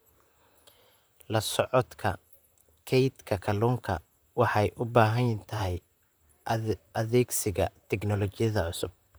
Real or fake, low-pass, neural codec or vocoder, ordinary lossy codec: fake; none; vocoder, 44.1 kHz, 128 mel bands, Pupu-Vocoder; none